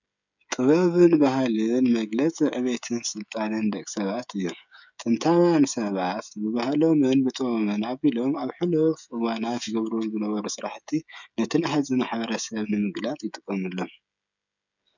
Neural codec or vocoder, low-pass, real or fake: codec, 16 kHz, 16 kbps, FreqCodec, smaller model; 7.2 kHz; fake